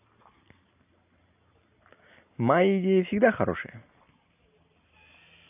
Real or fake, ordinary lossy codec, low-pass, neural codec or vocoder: real; AAC, 24 kbps; 3.6 kHz; none